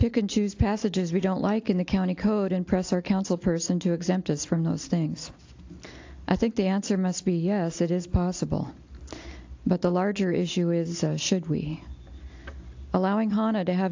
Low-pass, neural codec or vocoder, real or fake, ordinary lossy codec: 7.2 kHz; none; real; AAC, 48 kbps